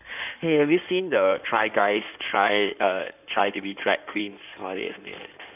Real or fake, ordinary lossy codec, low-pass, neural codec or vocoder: fake; none; 3.6 kHz; codec, 16 kHz in and 24 kHz out, 2.2 kbps, FireRedTTS-2 codec